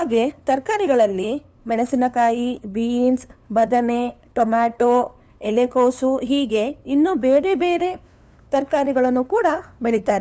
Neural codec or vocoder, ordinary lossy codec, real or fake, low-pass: codec, 16 kHz, 2 kbps, FunCodec, trained on LibriTTS, 25 frames a second; none; fake; none